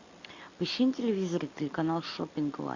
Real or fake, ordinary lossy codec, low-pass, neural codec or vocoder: real; MP3, 64 kbps; 7.2 kHz; none